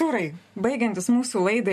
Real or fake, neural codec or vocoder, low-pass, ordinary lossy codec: real; none; 14.4 kHz; MP3, 64 kbps